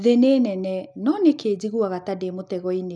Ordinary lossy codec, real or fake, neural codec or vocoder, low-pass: none; real; none; none